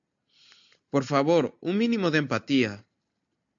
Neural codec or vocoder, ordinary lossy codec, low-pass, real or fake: none; AAC, 64 kbps; 7.2 kHz; real